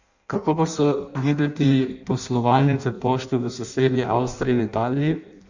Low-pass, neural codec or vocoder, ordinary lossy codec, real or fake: 7.2 kHz; codec, 16 kHz in and 24 kHz out, 0.6 kbps, FireRedTTS-2 codec; none; fake